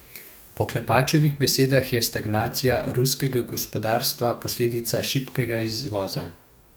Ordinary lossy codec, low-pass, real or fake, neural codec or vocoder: none; none; fake; codec, 44.1 kHz, 2.6 kbps, DAC